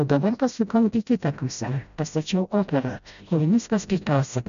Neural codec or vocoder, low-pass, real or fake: codec, 16 kHz, 0.5 kbps, FreqCodec, smaller model; 7.2 kHz; fake